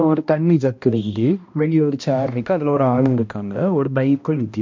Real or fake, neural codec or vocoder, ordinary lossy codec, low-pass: fake; codec, 16 kHz, 1 kbps, X-Codec, HuBERT features, trained on balanced general audio; MP3, 48 kbps; 7.2 kHz